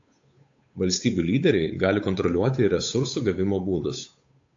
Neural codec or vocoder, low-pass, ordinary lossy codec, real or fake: codec, 16 kHz, 8 kbps, FunCodec, trained on Chinese and English, 25 frames a second; 7.2 kHz; AAC, 48 kbps; fake